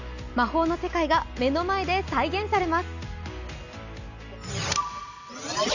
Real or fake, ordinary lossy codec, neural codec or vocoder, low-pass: real; none; none; 7.2 kHz